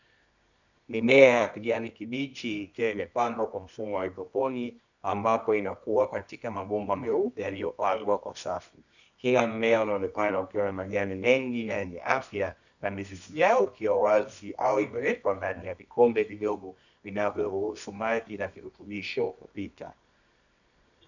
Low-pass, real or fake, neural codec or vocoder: 7.2 kHz; fake; codec, 24 kHz, 0.9 kbps, WavTokenizer, medium music audio release